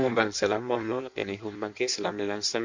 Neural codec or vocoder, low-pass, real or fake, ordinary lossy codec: codec, 16 kHz in and 24 kHz out, 1.1 kbps, FireRedTTS-2 codec; 7.2 kHz; fake; MP3, 64 kbps